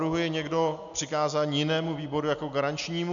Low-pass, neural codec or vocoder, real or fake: 7.2 kHz; none; real